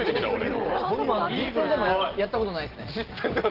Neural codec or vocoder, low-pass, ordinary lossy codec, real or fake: none; 5.4 kHz; Opus, 16 kbps; real